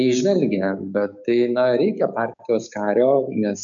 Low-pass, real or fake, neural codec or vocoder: 7.2 kHz; fake; codec, 16 kHz, 6 kbps, DAC